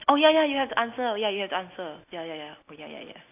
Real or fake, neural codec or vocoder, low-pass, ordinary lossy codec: real; none; 3.6 kHz; AAC, 32 kbps